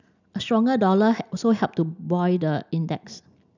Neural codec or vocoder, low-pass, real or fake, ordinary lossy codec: none; 7.2 kHz; real; none